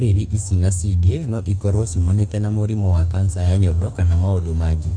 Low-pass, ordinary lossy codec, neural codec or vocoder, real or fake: 9.9 kHz; none; codec, 44.1 kHz, 2.6 kbps, DAC; fake